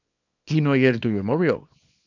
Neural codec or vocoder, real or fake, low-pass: codec, 24 kHz, 0.9 kbps, WavTokenizer, small release; fake; 7.2 kHz